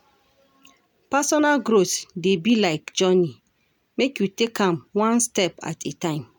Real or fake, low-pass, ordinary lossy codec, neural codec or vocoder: real; none; none; none